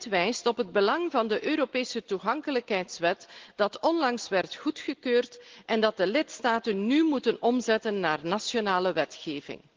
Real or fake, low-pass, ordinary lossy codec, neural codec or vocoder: real; 7.2 kHz; Opus, 16 kbps; none